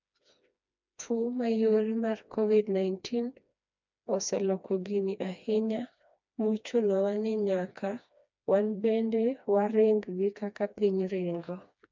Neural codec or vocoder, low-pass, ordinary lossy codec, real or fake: codec, 16 kHz, 2 kbps, FreqCodec, smaller model; 7.2 kHz; MP3, 64 kbps; fake